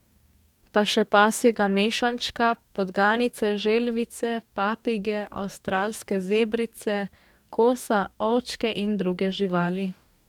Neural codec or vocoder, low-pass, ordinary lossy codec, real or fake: codec, 44.1 kHz, 2.6 kbps, DAC; 19.8 kHz; none; fake